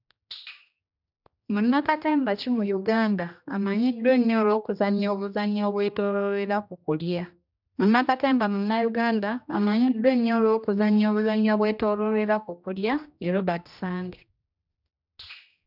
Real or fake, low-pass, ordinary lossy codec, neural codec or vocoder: fake; 5.4 kHz; none; codec, 16 kHz, 1 kbps, X-Codec, HuBERT features, trained on general audio